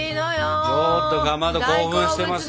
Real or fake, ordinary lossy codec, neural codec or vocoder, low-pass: real; none; none; none